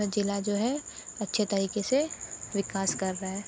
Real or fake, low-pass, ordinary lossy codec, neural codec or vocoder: real; none; none; none